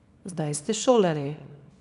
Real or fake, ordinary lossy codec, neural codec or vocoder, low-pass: fake; none; codec, 24 kHz, 0.9 kbps, WavTokenizer, small release; 10.8 kHz